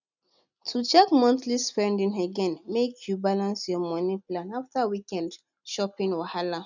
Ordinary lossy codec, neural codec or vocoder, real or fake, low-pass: none; none; real; 7.2 kHz